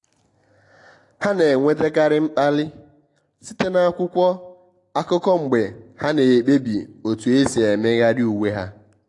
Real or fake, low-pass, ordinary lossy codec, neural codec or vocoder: real; 10.8 kHz; AAC, 48 kbps; none